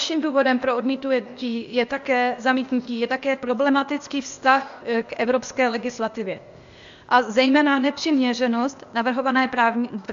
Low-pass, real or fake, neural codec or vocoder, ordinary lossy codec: 7.2 kHz; fake; codec, 16 kHz, 0.8 kbps, ZipCodec; AAC, 64 kbps